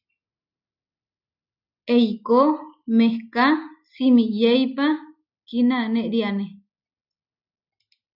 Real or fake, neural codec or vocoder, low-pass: real; none; 5.4 kHz